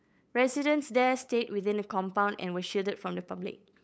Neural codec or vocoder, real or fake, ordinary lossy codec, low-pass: codec, 16 kHz, 8 kbps, FunCodec, trained on LibriTTS, 25 frames a second; fake; none; none